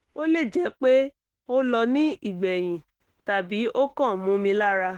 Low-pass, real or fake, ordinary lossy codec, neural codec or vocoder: 19.8 kHz; fake; Opus, 16 kbps; autoencoder, 48 kHz, 32 numbers a frame, DAC-VAE, trained on Japanese speech